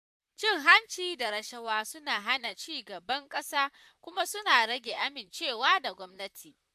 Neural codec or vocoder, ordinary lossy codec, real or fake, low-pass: vocoder, 44.1 kHz, 128 mel bands, Pupu-Vocoder; AAC, 96 kbps; fake; 14.4 kHz